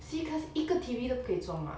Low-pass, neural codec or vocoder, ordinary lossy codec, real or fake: none; none; none; real